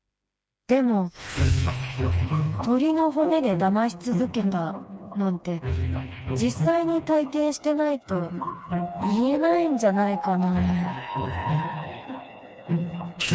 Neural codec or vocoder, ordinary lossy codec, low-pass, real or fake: codec, 16 kHz, 2 kbps, FreqCodec, smaller model; none; none; fake